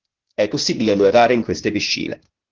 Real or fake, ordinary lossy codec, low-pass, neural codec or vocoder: fake; Opus, 16 kbps; 7.2 kHz; codec, 16 kHz, 0.8 kbps, ZipCodec